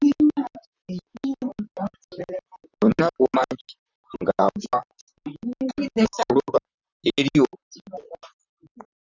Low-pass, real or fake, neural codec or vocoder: 7.2 kHz; fake; vocoder, 44.1 kHz, 128 mel bands, Pupu-Vocoder